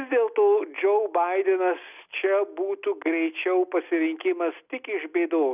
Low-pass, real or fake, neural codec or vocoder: 3.6 kHz; real; none